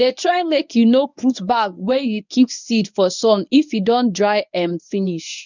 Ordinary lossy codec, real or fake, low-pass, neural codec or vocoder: none; fake; 7.2 kHz; codec, 24 kHz, 0.9 kbps, WavTokenizer, medium speech release version 1